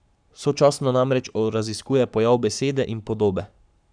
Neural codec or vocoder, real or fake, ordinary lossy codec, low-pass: codec, 44.1 kHz, 7.8 kbps, Pupu-Codec; fake; none; 9.9 kHz